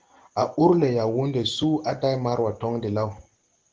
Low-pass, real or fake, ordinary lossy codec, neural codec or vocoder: 7.2 kHz; real; Opus, 16 kbps; none